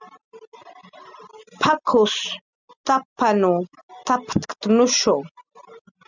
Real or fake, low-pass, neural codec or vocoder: real; 7.2 kHz; none